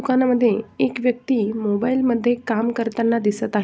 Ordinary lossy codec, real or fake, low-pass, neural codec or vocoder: none; real; none; none